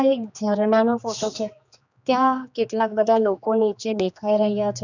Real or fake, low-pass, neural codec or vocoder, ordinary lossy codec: fake; 7.2 kHz; codec, 16 kHz, 2 kbps, X-Codec, HuBERT features, trained on general audio; none